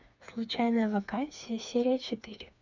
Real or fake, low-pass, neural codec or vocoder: fake; 7.2 kHz; codec, 16 kHz, 4 kbps, FreqCodec, smaller model